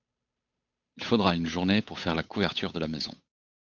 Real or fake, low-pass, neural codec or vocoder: fake; 7.2 kHz; codec, 16 kHz, 8 kbps, FunCodec, trained on Chinese and English, 25 frames a second